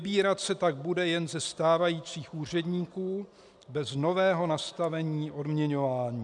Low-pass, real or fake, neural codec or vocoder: 10.8 kHz; real; none